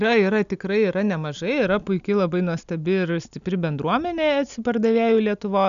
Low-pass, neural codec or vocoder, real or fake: 7.2 kHz; none; real